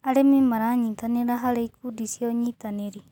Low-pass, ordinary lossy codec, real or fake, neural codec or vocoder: 19.8 kHz; none; real; none